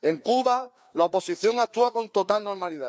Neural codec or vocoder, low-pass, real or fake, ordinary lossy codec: codec, 16 kHz, 2 kbps, FreqCodec, larger model; none; fake; none